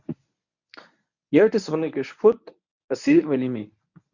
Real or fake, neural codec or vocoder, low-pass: fake; codec, 24 kHz, 0.9 kbps, WavTokenizer, medium speech release version 1; 7.2 kHz